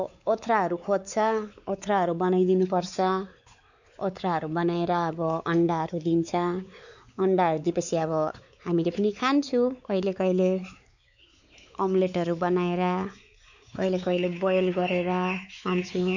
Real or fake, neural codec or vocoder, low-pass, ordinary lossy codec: fake; codec, 16 kHz, 4 kbps, X-Codec, WavLM features, trained on Multilingual LibriSpeech; 7.2 kHz; none